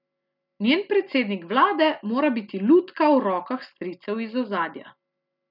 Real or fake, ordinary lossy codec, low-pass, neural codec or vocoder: real; none; 5.4 kHz; none